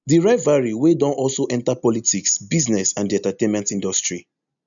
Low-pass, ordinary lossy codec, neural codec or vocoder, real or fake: 7.2 kHz; none; none; real